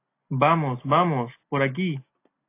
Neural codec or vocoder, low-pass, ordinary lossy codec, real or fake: none; 3.6 kHz; AAC, 24 kbps; real